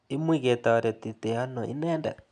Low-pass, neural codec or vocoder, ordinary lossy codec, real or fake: 9.9 kHz; none; none; real